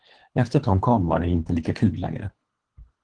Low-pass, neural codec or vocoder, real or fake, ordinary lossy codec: 9.9 kHz; codec, 24 kHz, 3 kbps, HILCodec; fake; Opus, 16 kbps